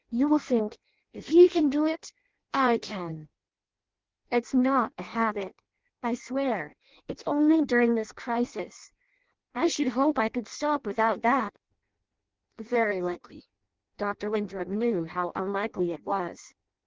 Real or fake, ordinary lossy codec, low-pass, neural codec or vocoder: fake; Opus, 16 kbps; 7.2 kHz; codec, 16 kHz in and 24 kHz out, 0.6 kbps, FireRedTTS-2 codec